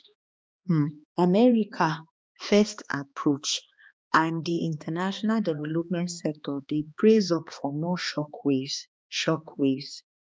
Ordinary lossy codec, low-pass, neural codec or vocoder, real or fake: none; none; codec, 16 kHz, 2 kbps, X-Codec, HuBERT features, trained on balanced general audio; fake